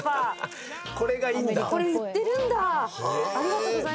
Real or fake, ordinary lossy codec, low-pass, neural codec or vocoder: real; none; none; none